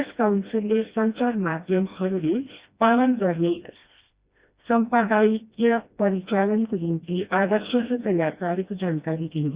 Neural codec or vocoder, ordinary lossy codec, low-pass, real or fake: codec, 16 kHz, 1 kbps, FreqCodec, smaller model; Opus, 64 kbps; 3.6 kHz; fake